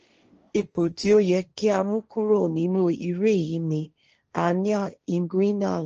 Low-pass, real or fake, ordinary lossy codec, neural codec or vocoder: 7.2 kHz; fake; Opus, 24 kbps; codec, 16 kHz, 1.1 kbps, Voila-Tokenizer